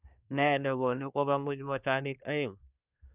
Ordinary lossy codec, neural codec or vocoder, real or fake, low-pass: none; codec, 24 kHz, 1 kbps, SNAC; fake; 3.6 kHz